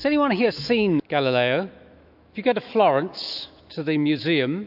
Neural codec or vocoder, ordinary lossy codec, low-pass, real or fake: autoencoder, 48 kHz, 128 numbers a frame, DAC-VAE, trained on Japanese speech; AAC, 48 kbps; 5.4 kHz; fake